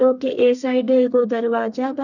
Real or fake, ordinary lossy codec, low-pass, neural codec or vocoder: fake; none; 7.2 kHz; codec, 32 kHz, 1.9 kbps, SNAC